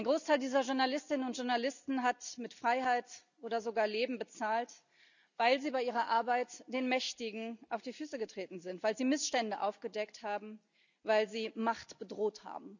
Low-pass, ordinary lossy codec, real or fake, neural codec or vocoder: 7.2 kHz; none; real; none